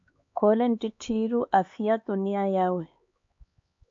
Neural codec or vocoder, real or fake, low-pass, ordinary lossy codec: codec, 16 kHz, 4 kbps, X-Codec, HuBERT features, trained on LibriSpeech; fake; 7.2 kHz; AAC, 48 kbps